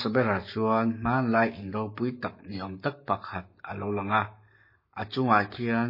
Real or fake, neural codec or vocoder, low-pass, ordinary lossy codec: fake; codec, 44.1 kHz, 7.8 kbps, Pupu-Codec; 5.4 kHz; MP3, 24 kbps